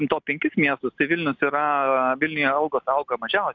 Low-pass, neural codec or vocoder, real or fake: 7.2 kHz; none; real